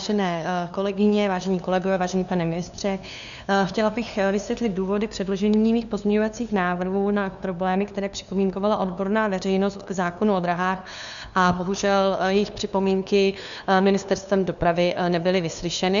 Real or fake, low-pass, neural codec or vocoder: fake; 7.2 kHz; codec, 16 kHz, 2 kbps, FunCodec, trained on LibriTTS, 25 frames a second